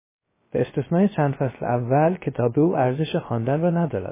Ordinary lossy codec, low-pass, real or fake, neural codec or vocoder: MP3, 16 kbps; 3.6 kHz; fake; codec, 16 kHz, 0.3 kbps, FocalCodec